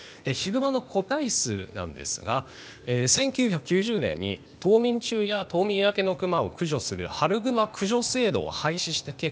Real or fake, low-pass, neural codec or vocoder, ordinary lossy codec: fake; none; codec, 16 kHz, 0.8 kbps, ZipCodec; none